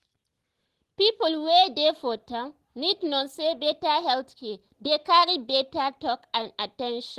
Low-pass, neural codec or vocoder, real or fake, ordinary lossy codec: 14.4 kHz; none; real; Opus, 16 kbps